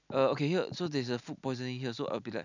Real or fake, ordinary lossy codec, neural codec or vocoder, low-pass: real; none; none; 7.2 kHz